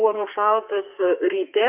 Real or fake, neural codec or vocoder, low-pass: fake; codec, 32 kHz, 1.9 kbps, SNAC; 3.6 kHz